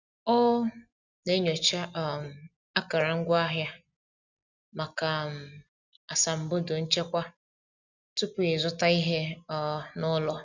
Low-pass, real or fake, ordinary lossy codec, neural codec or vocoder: 7.2 kHz; real; none; none